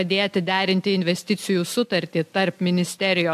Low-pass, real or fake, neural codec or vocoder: 14.4 kHz; real; none